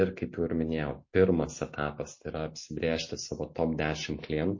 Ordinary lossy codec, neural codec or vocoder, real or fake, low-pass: MP3, 32 kbps; none; real; 7.2 kHz